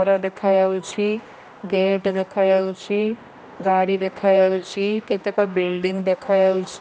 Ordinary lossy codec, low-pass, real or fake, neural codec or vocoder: none; none; fake; codec, 16 kHz, 1 kbps, X-Codec, HuBERT features, trained on general audio